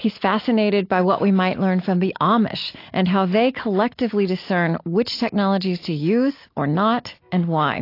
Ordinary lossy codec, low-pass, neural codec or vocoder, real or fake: AAC, 32 kbps; 5.4 kHz; none; real